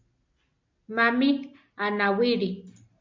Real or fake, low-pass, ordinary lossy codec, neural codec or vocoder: real; 7.2 kHz; MP3, 64 kbps; none